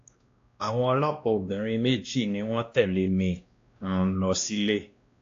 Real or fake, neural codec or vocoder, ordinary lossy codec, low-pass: fake; codec, 16 kHz, 1 kbps, X-Codec, WavLM features, trained on Multilingual LibriSpeech; AAC, 48 kbps; 7.2 kHz